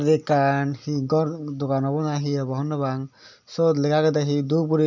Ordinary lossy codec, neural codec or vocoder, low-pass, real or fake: none; none; 7.2 kHz; real